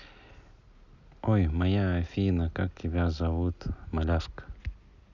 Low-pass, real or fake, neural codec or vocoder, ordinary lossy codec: 7.2 kHz; real; none; none